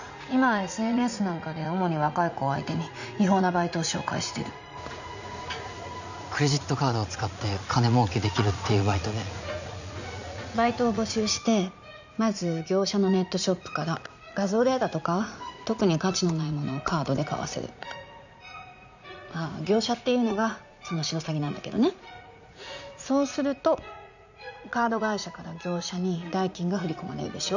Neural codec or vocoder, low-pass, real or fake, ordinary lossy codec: vocoder, 44.1 kHz, 80 mel bands, Vocos; 7.2 kHz; fake; none